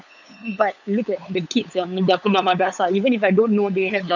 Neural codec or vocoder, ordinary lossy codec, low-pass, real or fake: codec, 16 kHz, 8 kbps, FunCodec, trained on LibriTTS, 25 frames a second; none; 7.2 kHz; fake